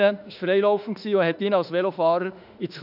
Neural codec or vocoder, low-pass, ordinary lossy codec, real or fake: autoencoder, 48 kHz, 32 numbers a frame, DAC-VAE, trained on Japanese speech; 5.4 kHz; none; fake